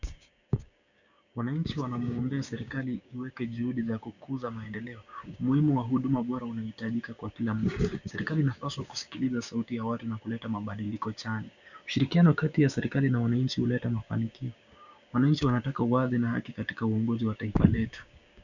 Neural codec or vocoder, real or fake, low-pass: codec, 16 kHz, 6 kbps, DAC; fake; 7.2 kHz